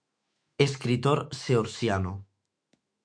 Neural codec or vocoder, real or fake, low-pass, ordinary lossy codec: autoencoder, 48 kHz, 128 numbers a frame, DAC-VAE, trained on Japanese speech; fake; 9.9 kHz; MP3, 64 kbps